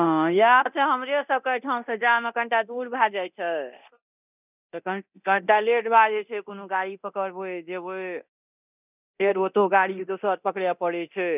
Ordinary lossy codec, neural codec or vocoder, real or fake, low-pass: none; codec, 24 kHz, 0.9 kbps, DualCodec; fake; 3.6 kHz